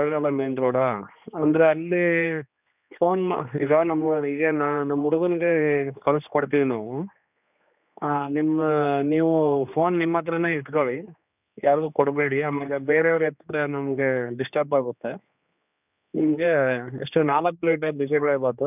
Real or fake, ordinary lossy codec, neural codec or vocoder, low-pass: fake; none; codec, 16 kHz, 2 kbps, X-Codec, HuBERT features, trained on general audio; 3.6 kHz